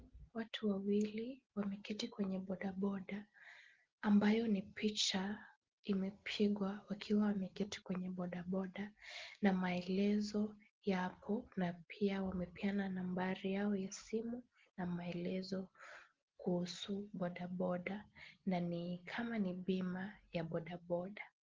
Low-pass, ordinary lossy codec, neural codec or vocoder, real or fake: 7.2 kHz; Opus, 16 kbps; none; real